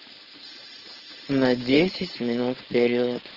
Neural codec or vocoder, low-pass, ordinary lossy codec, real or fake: codec, 16 kHz, 4.8 kbps, FACodec; 5.4 kHz; Opus, 16 kbps; fake